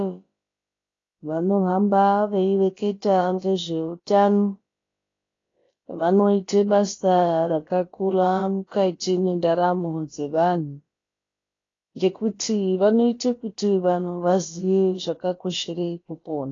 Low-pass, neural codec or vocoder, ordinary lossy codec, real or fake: 7.2 kHz; codec, 16 kHz, about 1 kbps, DyCAST, with the encoder's durations; AAC, 32 kbps; fake